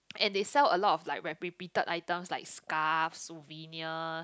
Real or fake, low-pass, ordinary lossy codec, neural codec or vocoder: real; none; none; none